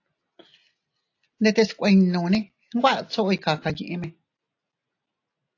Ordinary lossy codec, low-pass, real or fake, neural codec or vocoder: AAC, 48 kbps; 7.2 kHz; real; none